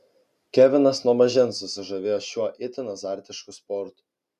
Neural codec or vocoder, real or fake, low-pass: none; real; 14.4 kHz